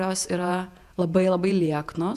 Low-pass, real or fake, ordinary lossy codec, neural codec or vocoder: 14.4 kHz; fake; AAC, 96 kbps; vocoder, 48 kHz, 128 mel bands, Vocos